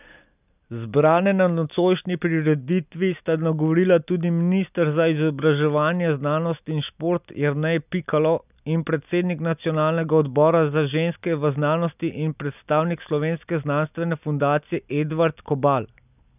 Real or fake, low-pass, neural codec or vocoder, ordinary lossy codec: real; 3.6 kHz; none; none